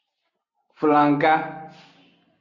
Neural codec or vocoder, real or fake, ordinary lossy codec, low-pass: none; real; Opus, 64 kbps; 7.2 kHz